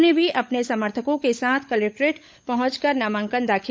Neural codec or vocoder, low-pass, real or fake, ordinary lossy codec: codec, 16 kHz, 16 kbps, FunCodec, trained on LibriTTS, 50 frames a second; none; fake; none